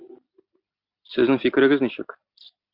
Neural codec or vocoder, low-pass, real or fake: none; 5.4 kHz; real